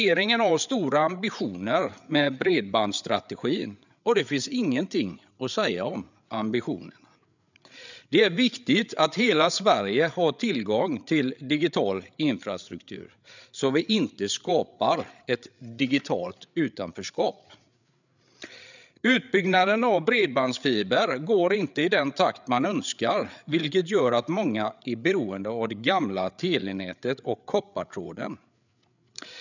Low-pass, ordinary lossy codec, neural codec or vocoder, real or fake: 7.2 kHz; none; codec, 16 kHz, 8 kbps, FreqCodec, larger model; fake